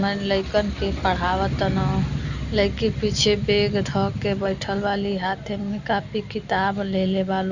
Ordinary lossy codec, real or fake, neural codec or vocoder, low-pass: Opus, 64 kbps; real; none; 7.2 kHz